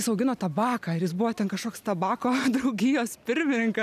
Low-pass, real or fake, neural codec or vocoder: 14.4 kHz; real; none